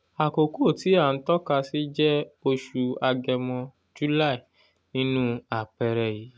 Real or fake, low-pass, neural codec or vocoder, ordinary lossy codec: real; none; none; none